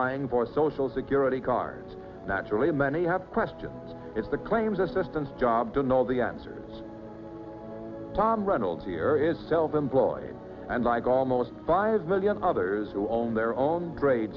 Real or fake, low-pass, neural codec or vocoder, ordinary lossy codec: real; 7.2 kHz; none; Opus, 64 kbps